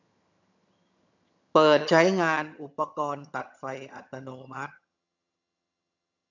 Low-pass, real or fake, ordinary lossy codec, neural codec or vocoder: 7.2 kHz; fake; none; vocoder, 22.05 kHz, 80 mel bands, HiFi-GAN